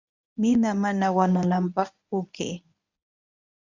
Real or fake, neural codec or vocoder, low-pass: fake; codec, 24 kHz, 0.9 kbps, WavTokenizer, medium speech release version 1; 7.2 kHz